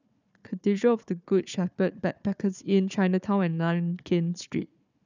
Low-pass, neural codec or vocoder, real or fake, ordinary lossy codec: 7.2 kHz; codec, 16 kHz, 4 kbps, FunCodec, trained on Chinese and English, 50 frames a second; fake; none